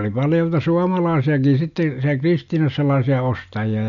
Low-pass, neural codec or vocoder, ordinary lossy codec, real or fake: 7.2 kHz; none; none; real